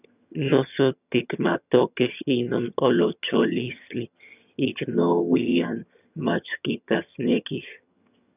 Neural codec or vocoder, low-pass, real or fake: vocoder, 22.05 kHz, 80 mel bands, HiFi-GAN; 3.6 kHz; fake